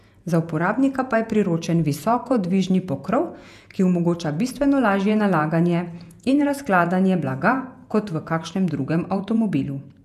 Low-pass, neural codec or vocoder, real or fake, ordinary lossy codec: 14.4 kHz; vocoder, 48 kHz, 128 mel bands, Vocos; fake; none